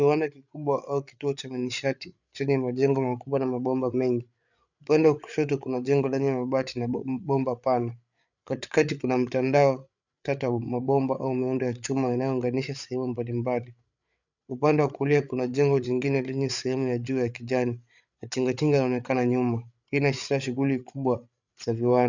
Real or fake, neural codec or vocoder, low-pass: fake; codec, 16 kHz, 8 kbps, FreqCodec, larger model; 7.2 kHz